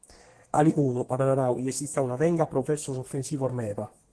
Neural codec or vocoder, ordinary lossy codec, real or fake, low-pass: codec, 32 kHz, 1.9 kbps, SNAC; Opus, 16 kbps; fake; 10.8 kHz